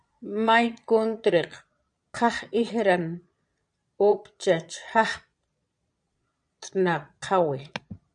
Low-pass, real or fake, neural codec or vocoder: 9.9 kHz; fake; vocoder, 22.05 kHz, 80 mel bands, Vocos